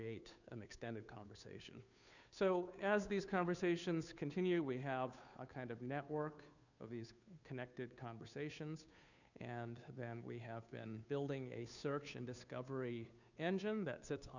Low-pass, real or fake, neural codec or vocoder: 7.2 kHz; fake; codec, 16 kHz, 2 kbps, FunCodec, trained on Chinese and English, 25 frames a second